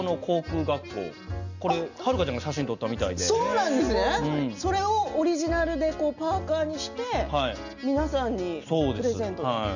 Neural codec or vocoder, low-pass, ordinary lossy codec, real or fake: none; 7.2 kHz; none; real